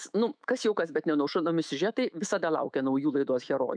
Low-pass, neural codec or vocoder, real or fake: 9.9 kHz; autoencoder, 48 kHz, 128 numbers a frame, DAC-VAE, trained on Japanese speech; fake